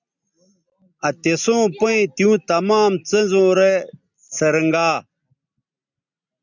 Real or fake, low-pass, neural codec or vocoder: real; 7.2 kHz; none